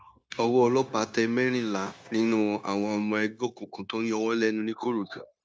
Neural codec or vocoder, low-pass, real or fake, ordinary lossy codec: codec, 16 kHz, 0.9 kbps, LongCat-Audio-Codec; none; fake; none